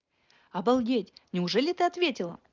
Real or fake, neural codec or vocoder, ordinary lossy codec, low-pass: real; none; Opus, 32 kbps; 7.2 kHz